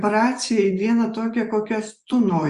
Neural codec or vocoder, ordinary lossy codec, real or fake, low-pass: vocoder, 24 kHz, 100 mel bands, Vocos; Opus, 64 kbps; fake; 10.8 kHz